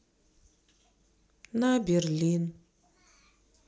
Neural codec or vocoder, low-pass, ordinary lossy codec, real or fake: none; none; none; real